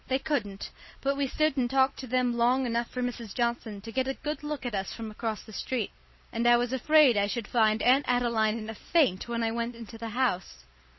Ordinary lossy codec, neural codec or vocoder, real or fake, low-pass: MP3, 24 kbps; vocoder, 44.1 kHz, 128 mel bands every 256 samples, BigVGAN v2; fake; 7.2 kHz